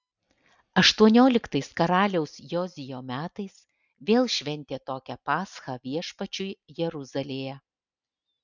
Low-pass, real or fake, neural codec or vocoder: 7.2 kHz; real; none